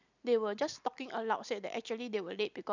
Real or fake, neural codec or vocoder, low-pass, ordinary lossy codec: fake; vocoder, 44.1 kHz, 128 mel bands every 256 samples, BigVGAN v2; 7.2 kHz; none